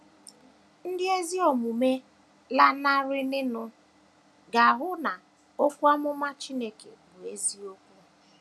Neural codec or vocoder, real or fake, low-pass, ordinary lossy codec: none; real; none; none